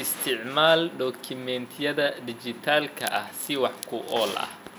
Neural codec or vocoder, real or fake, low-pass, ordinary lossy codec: none; real; none; none